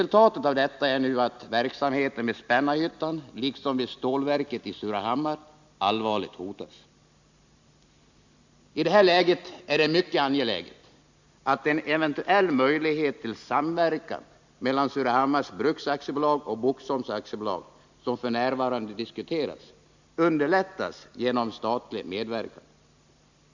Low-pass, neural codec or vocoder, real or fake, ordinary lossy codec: 7.2 kHz; none; real; Opus, 64 kbps